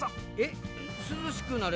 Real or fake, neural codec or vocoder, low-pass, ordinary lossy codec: real; none; none; none